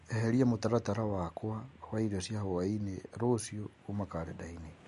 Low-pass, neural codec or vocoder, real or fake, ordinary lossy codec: 14.4 kHz; none; real; MP3, 48 kbps